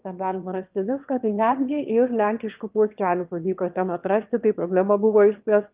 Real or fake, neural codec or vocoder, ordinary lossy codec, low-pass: fake; autoencoder, 22.05 kHz, a latent of 192 numbers a frame, VITS, trained on one speaker; Opus, 32 kbps; 3.6 kHz